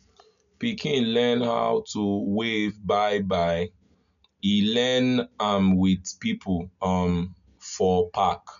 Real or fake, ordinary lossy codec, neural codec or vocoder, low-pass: real; none; none; 7.2 kHz